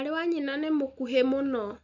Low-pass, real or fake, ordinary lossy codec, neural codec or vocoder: 7.2 kHz; real; none; none